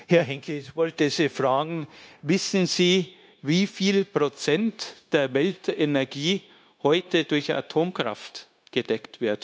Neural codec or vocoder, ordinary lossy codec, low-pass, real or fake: codec, 16 kHz, 0.9 kbps, LongCat-Audio-Codec; none; none; fake